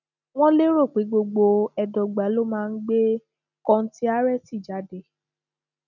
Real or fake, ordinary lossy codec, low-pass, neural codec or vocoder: real; none; 7.2 kHz; none